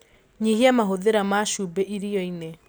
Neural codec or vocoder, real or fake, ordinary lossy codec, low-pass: none; real; none; none